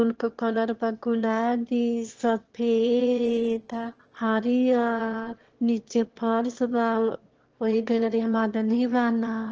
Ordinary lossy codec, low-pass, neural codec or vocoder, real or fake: Opus, 16 kbps; 7.2 kHz; autoencoder, 22.05 kHz, a latent of 192 numbers a frame, VITS, trained on one speaker; fake